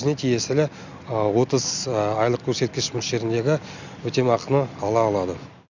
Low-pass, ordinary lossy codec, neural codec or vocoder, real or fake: 7.2 kHz; none; none; real